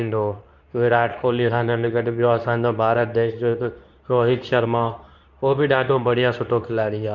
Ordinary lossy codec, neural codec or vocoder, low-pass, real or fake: none; codec, 24 kHz, 0.9 kbps, WavTokenizer, medium speech release version 2; 7.2 kHz; fake